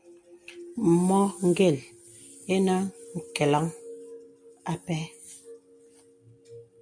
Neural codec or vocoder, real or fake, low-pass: none; real; 9.9 kHz